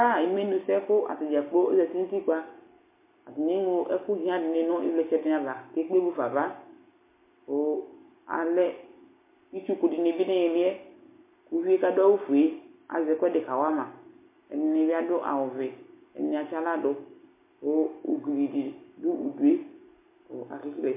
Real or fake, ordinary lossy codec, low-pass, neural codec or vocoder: real; MP3, 24 kbps; 3.6 kHz; none